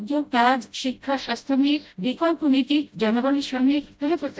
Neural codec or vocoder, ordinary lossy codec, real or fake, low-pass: codec, 16 kHz, 0.5 kbps, FreqCodec, smaller model; none; fake; none